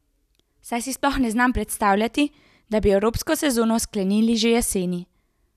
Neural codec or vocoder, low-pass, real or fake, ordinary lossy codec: none; 14.4 kHz; real; none